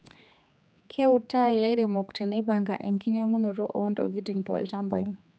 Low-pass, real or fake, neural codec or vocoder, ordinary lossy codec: none; fake; codec, 16 kHz, 2 kbps, X-Codec, HuBERT features, trained on general audio; none